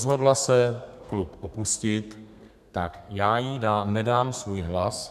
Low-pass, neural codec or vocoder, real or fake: 14.4 kHz; codec, 32 kHz, 1.9 kbps, SNAC; fake